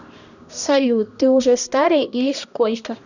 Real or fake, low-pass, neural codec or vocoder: fake; 7.2 kHz; codec, 16 kHz, 1 kbps, X-Codec, HuBERT features, trained on balanced general audio